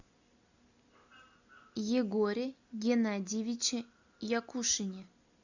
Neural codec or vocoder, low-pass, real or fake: none; 7.2 kHz; real